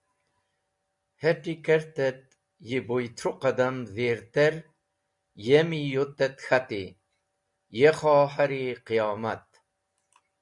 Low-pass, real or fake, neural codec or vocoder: 10.8 kHz; real; none